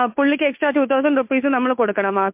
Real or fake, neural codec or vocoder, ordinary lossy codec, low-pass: fake; codec, 16 kHz, 2 kbps, FunCodec, trained on Chinese and English, 25 frames a second; MP3, 32 kbps; 3.6 kHz